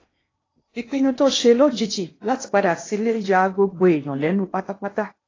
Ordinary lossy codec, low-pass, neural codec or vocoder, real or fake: AAC, 32 kbps; 7.2 kHz; codec, 16 kHz in and 24 kHz out, 0.8 kbps, FocalCodec, streaming, 65536 codes; fake